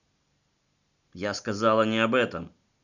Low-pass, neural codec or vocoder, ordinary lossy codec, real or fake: 7.2 kHz; none; none; real